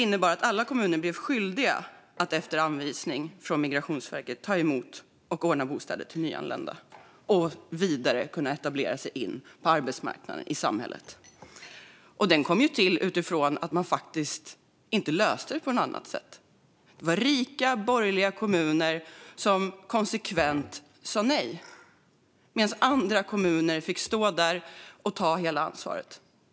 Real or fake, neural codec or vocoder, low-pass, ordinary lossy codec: real; none; none; none